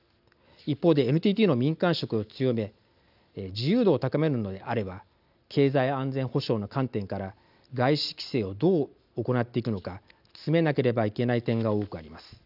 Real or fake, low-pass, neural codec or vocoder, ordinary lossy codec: real; 5.4 kHz; none; none